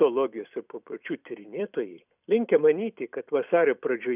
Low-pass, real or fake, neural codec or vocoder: 3.6 kHz; fake; vocoder, 44.1 kHz, 128 mel bands every 256 samples, BigVGAN v2